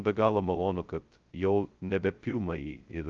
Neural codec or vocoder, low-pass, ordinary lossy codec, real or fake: codec, 16 kHz, 0.2 kbps, FocalCodec; 7.2 kHz; Opus, 24 kbps; fake